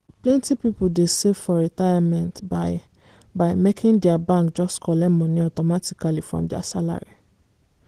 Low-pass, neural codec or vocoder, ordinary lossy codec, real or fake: 14.4 kHz; none; Opus, 16 kbps; real